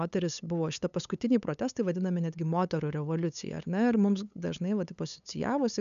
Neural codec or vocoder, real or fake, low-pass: codec, 16 kHz, 8 kbps, FunCodec, trained on LibriTTS, 25 frames a second; fake; 7.2 kHz